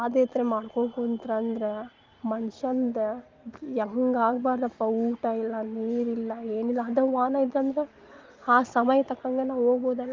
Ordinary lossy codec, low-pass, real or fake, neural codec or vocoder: Opus, 32 kbps; 7.2 kHz; real; none